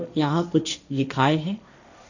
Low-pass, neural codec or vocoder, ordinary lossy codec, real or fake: 7.2 kHz; codec, 16 kHz, 1.1 kbps, Voila-Tokenizer; none; fake